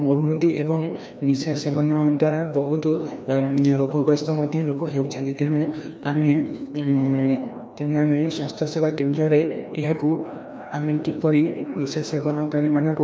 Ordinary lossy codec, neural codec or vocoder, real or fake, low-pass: none; codec, 16 kHz, 1 kbps, FreqCodec, larger model; fake; none